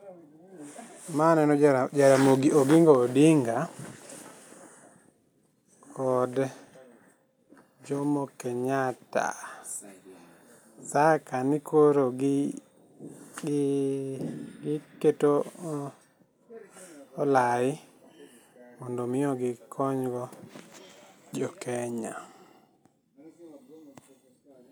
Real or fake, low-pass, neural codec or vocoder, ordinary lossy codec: real; none; none; none